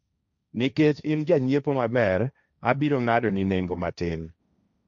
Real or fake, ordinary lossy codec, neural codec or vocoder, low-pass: fake; MP3, 96 kbps; codec, 16 kHz, 1.1 kbps, Voila-Tokenizer; 7.2 kHz